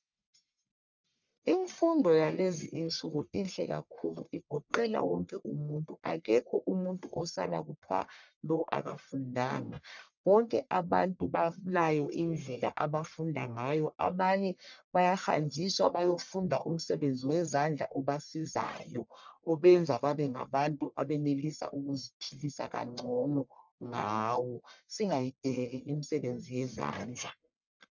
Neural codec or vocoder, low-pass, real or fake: codec, 44.1 kHz, 1.7 kbps, Pupu-Codec; 7.2 kHz; fake